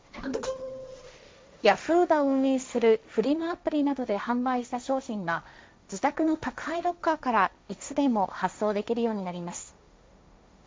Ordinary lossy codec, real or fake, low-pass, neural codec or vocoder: none; fake; none; codec, 16 kHz, 1.1 kbps, Voila-Tokenizer